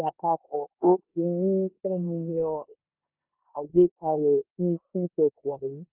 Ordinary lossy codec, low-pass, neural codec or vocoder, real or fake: Opus, 32 kbps; 3.6 kHz; codec, 16 kHz in and 24 kHz out, 0.9 kbps, LongCat-Audio-Codec, four codebook decoder; fake